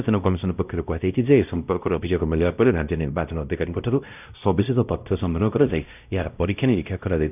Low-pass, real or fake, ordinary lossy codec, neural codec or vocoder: 3.6 kHz; fake; none; codec, 16 kHz, 0.5 kbps, X-Codec, WavLM features, trained on Multilingual LibriSpeech